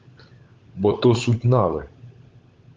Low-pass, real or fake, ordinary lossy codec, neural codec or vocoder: 7.2 kHz; fake; Opus, 32 kbps; codec, 16 kHz, 16 kbps, FunCodec, trained on LibriTTS, 50 frames a second